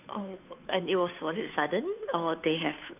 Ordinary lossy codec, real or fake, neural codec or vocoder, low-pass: none; fake; codec, 16 kHz, 2 kbps, FunCodec, trained on Chinese and English, 25 frames a second; 3.6 kHz